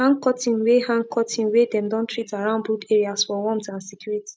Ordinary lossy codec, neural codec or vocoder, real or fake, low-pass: none; none; real; none